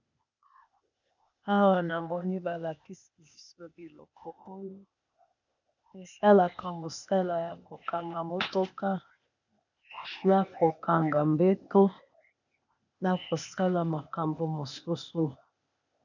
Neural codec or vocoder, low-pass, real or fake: codec, 16 kHz, 0.8 kbps, ZipCodec; 7.2 kHz; fake